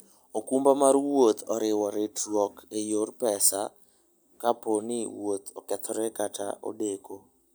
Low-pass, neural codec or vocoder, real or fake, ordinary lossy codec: none; none; real; none